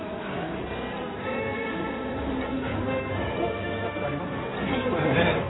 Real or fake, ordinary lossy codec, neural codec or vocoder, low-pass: fake; AAC, 16 kbps; codec, 16 kHz in and 24 kHz out, 2.2 kbps, FireRedTTS-2 codec; 7.2 kHz